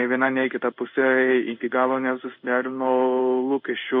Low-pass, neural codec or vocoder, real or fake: 5.4 kHz; codec, 16 kHz in and 24 kHz out, 1 kbps, XY-Tokenizer; fake